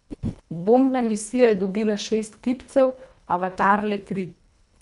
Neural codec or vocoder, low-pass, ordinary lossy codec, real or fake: codec, 24 kHz, 1.5 kbps, HILCodec; 10.8 kHz; none; fake